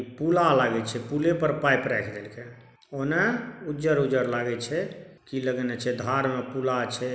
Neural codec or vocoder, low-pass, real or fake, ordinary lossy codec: none; none; real; none